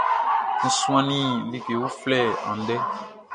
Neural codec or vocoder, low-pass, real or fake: none; 9.9 kHz; real